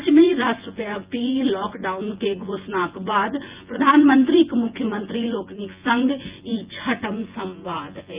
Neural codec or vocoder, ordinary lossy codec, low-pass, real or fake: vocoder, 24 kHz, 100 mel bands, Vocos; Opus, 24 kbps; 3.6 kHz; fake